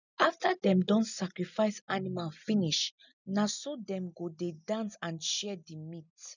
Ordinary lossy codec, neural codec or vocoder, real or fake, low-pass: none; none; real; 7.2 kHz